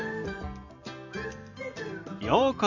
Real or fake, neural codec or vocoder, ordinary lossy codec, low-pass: real; none; none; 7.2 kHz